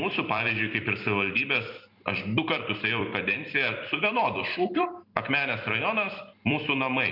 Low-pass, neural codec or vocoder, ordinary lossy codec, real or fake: 5.4 kHz; vocoder, 44.1 kHz, 128 mel bands every 256 samples, BigVGAN v2; MP3, 48 kbps; fake